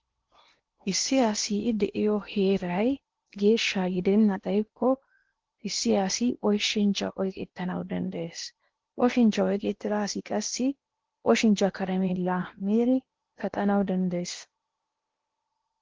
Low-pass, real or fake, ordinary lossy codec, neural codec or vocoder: 7.2 kHz; fake; Opus, 16 kbps; codec, 16 kHz in and 24 kHz out, 0.8 kbps, FocalCodec, streaming, 65536 codes